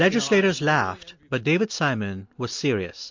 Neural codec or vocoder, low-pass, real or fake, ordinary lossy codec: none; 7.2 kHz; real; MP3, 48 kbps